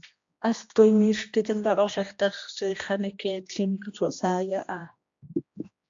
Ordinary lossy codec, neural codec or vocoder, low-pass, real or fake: MP3, 48 kbps; codec, 16 kHz, 1 kbps, X-Codec, HuBERT features, trained on general audio; 7.2 kHz; fake